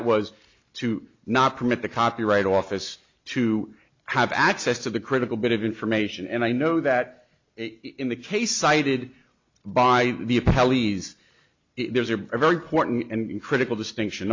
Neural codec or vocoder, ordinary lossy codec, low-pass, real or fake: none; MP3, 64 kbps; 7.2 kHz; real